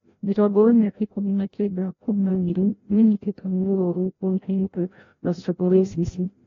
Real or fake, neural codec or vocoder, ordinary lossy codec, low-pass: fake; codec, 16 kHz, 0.5 kbps, FreqCodec, larger model; AAC, 32 kbps; 7.2 kHz